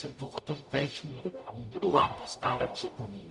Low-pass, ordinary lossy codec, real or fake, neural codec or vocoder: 10.8 kHz; Opus, 64 kbps; fake; codec, 44.1 kHz, 0.9 kbps, DAC